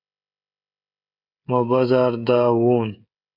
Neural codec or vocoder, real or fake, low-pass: codec, 16 kHz, 16 kbps, FreqCodec, smaller model; fake; 5.4 kHz